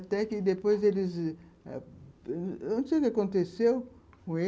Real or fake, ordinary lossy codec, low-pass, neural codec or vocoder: real; none; none; none